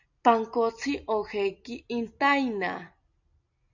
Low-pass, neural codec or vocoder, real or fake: 7.2 kHz; none; real